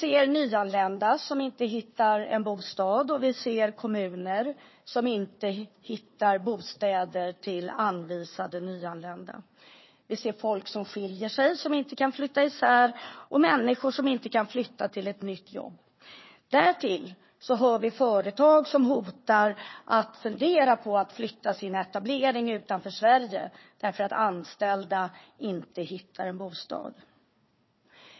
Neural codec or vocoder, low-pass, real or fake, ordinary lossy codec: codec, 16 kHz, 4 kbps, FunCodec, trained on Chinese and English, 50 frames a second; 7.2 kHz; fake; MP3, 24 kbps